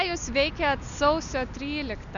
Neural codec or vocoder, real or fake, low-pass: none; real; 7.2 kHz